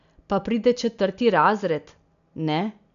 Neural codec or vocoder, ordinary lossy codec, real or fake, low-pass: none; none; real; 7.2 kHz